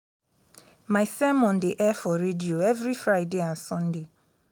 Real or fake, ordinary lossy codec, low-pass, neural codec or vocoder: real; none; none; none